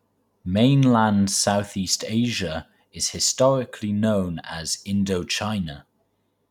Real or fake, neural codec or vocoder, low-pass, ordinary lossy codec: real; none; 19.8 kHz; none